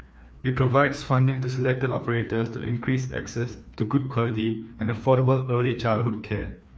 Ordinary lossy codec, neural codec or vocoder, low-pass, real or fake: none; codec, 16 kHz, 2 kbps, FreqCodec, larger model; none; fake